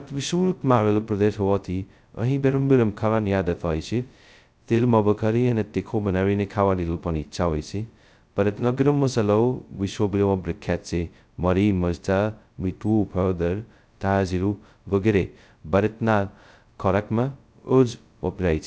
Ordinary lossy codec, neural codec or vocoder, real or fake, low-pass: none; codec, 16 kHz, 0.2 kbps, FocalCodec; fake; none